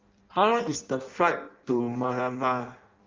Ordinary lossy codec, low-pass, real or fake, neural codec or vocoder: Opus, 32 kbps; 7.2 kHz; fake; codec, 16 kHz in and 24 kHz out, 0.6 kbps, FireRedTTS-2 codec